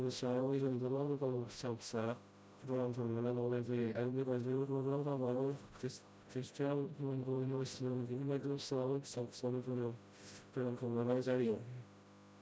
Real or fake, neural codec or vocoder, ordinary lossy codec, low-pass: fake; codec, 16 kHz, 0.5 kbps, FreqCodec, smaller model; none; none